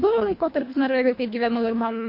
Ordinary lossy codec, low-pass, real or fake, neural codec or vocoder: MP3, 32 kbps; 5.4 kHz; fake; codec, 24 kHz, 1.5 kbps, HILCodec